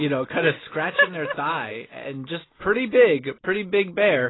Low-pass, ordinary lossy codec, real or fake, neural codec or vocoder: 7.2 kHz; AAC, 16 kbps; real; none